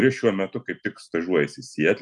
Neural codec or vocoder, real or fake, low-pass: none; real; 10.8 kHz